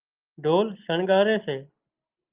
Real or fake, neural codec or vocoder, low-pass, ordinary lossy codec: real; none; 3.6 kHz; Opus, 24 kbps